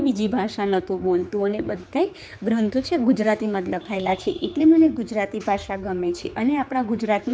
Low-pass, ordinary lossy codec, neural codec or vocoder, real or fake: none; none; codec, 16 kHz, 4 kbps, X-Codec, HuBERT features, trained on general audio; fake